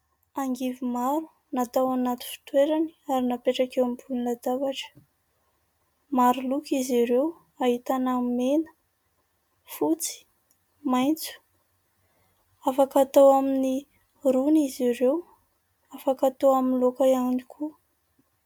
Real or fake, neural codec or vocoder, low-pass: real; none; 19.8 kHz